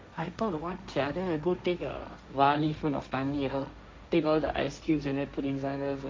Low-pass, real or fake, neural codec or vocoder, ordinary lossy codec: none; fake; codec, 16 kHz, 1.1 kbps, Voila-Tokenizer; none